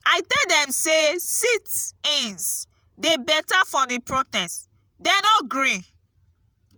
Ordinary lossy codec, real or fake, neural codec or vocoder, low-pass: none; fake; vocoder, 48 kHz, 128 mel bands, Vocos; none